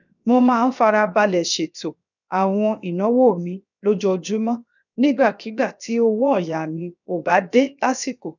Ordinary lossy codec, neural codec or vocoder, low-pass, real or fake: none; codec, 16 kHz, 0.7 kbps, FocalCodec; 7.2 kHz; fake